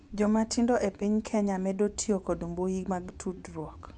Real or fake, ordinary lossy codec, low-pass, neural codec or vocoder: fake; none; 10.8 kHz; vocoder, 24 kHz, 100 mel bands, Vocos